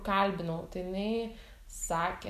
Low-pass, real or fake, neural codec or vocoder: 14.4 kHz; real; none